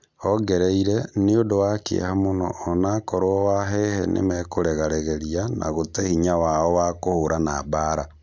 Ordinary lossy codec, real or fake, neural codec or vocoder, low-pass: none; real; none; none